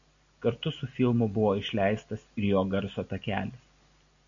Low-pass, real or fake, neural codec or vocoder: 7.2 kHz; real; none